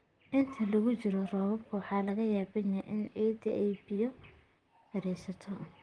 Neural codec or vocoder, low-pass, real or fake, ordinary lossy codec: vocoder, 44.1 kHz, 128 mel bands, Pupu-Vocoder; 9.9 kHz; fake; Opus, 24 kbps